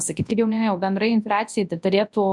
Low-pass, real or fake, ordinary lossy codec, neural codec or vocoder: 10.8 kHz; fake; MP3, 96 kbps; codec, 24 kHz, 0.9 kbps, WavTokenizer, large speech release